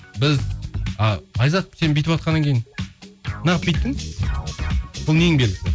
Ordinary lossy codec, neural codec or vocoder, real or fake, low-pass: none; none; real; none